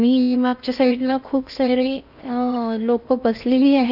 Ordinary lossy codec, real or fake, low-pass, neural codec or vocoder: none; fake; 5.4 kHz; codec, 16 kHz in and 24 kHz out, 0.6 kbps, FocalCodec, streaming, 4096 codes